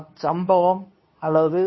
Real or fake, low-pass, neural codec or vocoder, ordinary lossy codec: fake; 7.2 kHz; codec, 24 kHz, 0.9 kbps, WavTokenizer, medium speech release version 2; MP3, 24 kbps